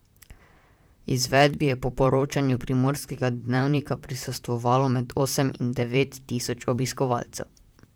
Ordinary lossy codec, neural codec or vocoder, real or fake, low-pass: none; vocoder, 44.1 kHz, 128 mel bands, Pupu-Vocoder; fake; none